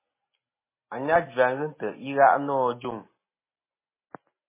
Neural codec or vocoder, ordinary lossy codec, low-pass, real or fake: none; MP3, 16 kbps; 3.6 kHz; real